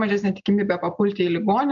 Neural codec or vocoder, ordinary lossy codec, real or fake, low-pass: none; AAC, 64 kbps; real; 7.2 kHz